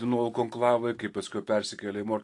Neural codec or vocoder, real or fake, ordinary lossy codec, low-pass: none; real; AAC, 48 kbps; 10.8 kHz